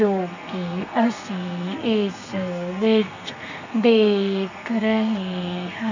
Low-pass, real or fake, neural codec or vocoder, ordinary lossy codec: 7.2 kHz; fake; codec, 32 kHz, 1.9 kbps, SNAC; none